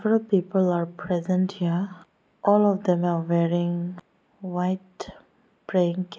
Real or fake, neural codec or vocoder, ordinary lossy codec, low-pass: real; none; none; none